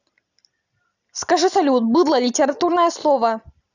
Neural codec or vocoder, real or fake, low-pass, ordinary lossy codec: none; real; 7.2 kHz; none